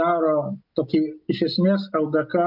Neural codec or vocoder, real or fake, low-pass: none; real; 5.4 kHz